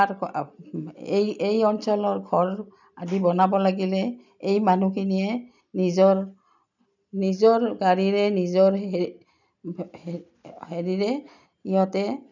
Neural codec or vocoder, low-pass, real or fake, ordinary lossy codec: none; 7.2 kHz; real; none